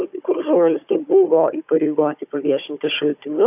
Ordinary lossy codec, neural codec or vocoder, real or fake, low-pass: AAC, 32 kbps; codec, 16 kHz, 4 kbps, FunCodec, trained on Chinese and English, 50 frames a second; fake; 3.6 kHz